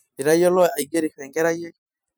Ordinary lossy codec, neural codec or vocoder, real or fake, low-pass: none; vocoder, 44.1 kHz, 128 mel bands every 512 samples, BigVGAN v2; fake; none